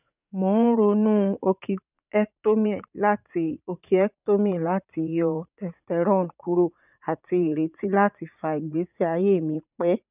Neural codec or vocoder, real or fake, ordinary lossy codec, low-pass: vocoder, 44.1 kHz, 80 mel bands, Vocos; fake; none; 3.6 kHz